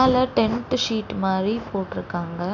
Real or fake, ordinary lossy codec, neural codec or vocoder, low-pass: real; none; none; 7.2 kHz